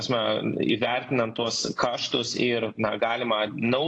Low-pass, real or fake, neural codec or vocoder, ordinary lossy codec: 9.9 kHz; real; none; AAC, 32 kbps